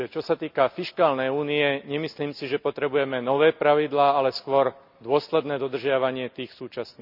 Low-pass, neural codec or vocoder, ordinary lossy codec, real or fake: 5.4 kHz; none; none; real